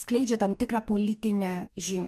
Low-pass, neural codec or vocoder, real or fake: 14.4 kHz; codec, 44.1 kHz, 2.6 kbps, DAC; fake